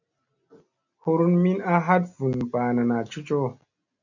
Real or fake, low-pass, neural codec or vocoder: real; 7.2 kHz; none